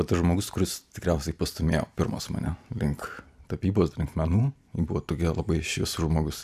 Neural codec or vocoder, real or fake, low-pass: none; real; 14.4 kHz